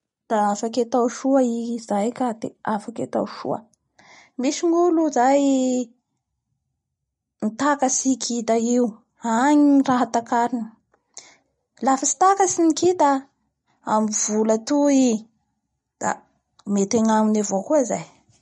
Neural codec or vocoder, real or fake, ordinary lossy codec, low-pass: none; real; MP3, 48 kbps; 19.8 kHz